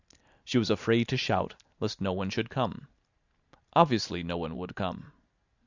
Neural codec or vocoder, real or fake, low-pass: none; real; 7.2 kHz